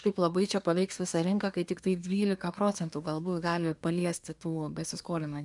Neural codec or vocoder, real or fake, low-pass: codec, 24 kHz, 1 kbps, SNAC; fake; 10.8 kHz